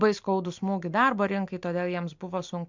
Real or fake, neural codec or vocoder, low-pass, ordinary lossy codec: real; none; 7.2 kHz; MP3, 64 kbps